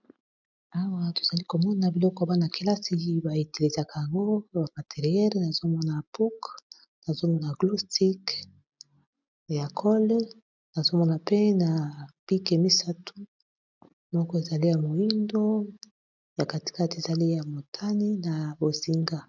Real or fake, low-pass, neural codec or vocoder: real; 7.2 kHz; none